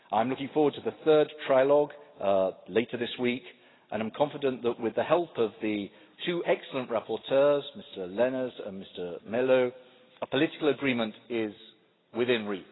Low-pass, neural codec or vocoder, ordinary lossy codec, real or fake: 7.2 kHz; none; AAC, 16 kbps; real